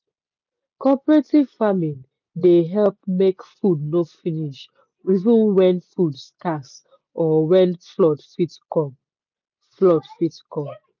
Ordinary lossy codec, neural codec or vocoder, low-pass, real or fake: none; none; 7.2 kHz; real